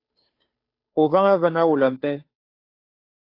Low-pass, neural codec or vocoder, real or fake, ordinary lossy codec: 5.4 kHz; codec, 16 kHz, 2 kbps, FunCodec, trained on Chinese and English, 25 frames a second; fake; AAC, 32 kbps